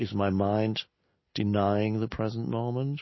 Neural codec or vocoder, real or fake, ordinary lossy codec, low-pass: none; real; MP3, 24 kbps; 7.2 kHz